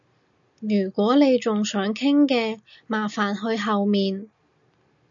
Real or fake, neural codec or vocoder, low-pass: real; none; 7.2 kHz